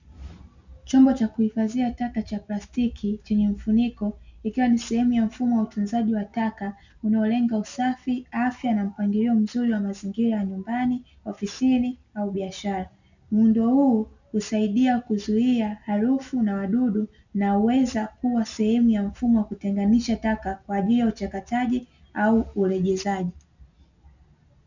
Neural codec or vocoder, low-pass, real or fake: none; 7.2 kHz; real